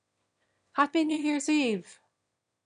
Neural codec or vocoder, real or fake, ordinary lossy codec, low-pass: autoencoder, 22.05 kHz, a latent of 192 numbers a frame, VITS, trained on one speaker; fake; none; 9.9 kHz